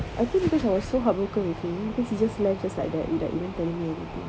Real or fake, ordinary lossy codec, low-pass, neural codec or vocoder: real; none; none; none